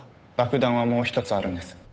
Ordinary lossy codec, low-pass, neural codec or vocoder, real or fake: none; none; codec, 16 kHz, 8 kbps, FunCodec, trained on Chinese and English, 25 frames a second; fake